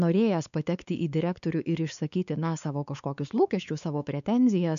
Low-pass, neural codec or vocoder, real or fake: 7.2 kHz; codec, 16 kHz, 4 kbps, X-Codec, WavLM features, trained on Multilingual LibriSpeech; fake